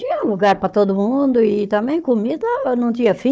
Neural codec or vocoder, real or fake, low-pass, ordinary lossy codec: codec, 16 kHz, 4 kbps, FunCodec, trained on Chinese and English, 50 frames a second; fake; none; none